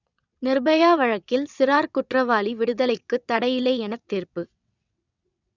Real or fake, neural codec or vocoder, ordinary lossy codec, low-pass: fake; vocoder, 22.05 kHz, 80 mel bands, WaveNeXt; none; 7.2 kHz